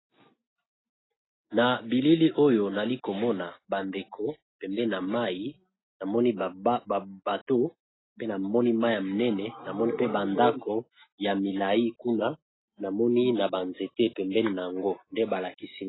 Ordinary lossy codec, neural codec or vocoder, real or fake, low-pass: AAC, 16 kbps; none; real; 7.2 kHz